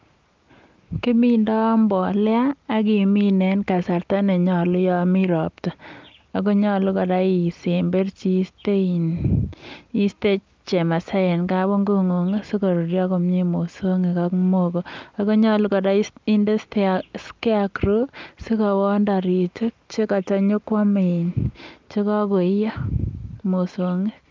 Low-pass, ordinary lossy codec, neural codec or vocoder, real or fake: 7.2 kHz; Opus, 24 kbps; none; real